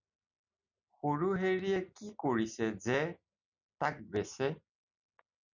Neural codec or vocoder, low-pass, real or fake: none; 7.2 kHz; real